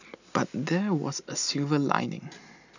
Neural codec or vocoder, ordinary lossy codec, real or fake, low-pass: none; none; real; 7.2 kHz